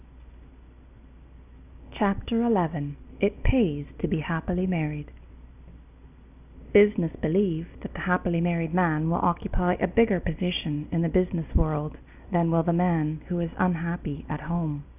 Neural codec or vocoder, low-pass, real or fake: none; 3.6 kHz; real